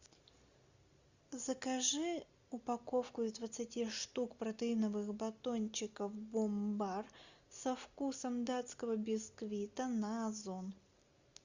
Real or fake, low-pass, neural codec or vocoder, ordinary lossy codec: real; 7.2 kHz; none; Opus, 64 kbps